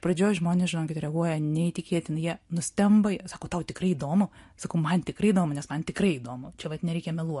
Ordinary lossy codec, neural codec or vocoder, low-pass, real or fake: MP3, 48 kbps; none; 14.4 kHz; real